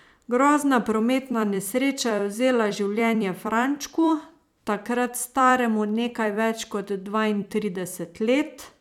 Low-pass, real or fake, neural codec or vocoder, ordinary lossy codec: 19.8 kHz; fake; vocoder, 44.1 kHz, 128 mel bands every 256 samples, BigVGAN v2; none